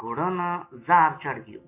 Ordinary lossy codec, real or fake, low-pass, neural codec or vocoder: MP3, 24 kbps; real; 3.6 kHz; none